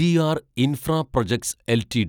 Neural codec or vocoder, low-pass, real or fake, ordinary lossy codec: none; none; real; none